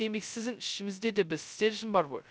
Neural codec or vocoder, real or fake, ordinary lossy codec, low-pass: codec, 16 kHz, 0.2 kbps, FocalCodec; fake; none; none